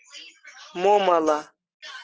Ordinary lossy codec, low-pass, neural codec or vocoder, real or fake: Opus, 16 kbps; 7.2 kHz; none; real